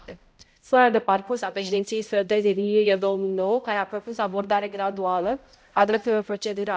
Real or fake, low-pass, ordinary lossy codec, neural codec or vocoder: fake; none; none; codec, 16 kHz, 0.5 kbps, X-Codec, HuBERT features, trained on balanced general audio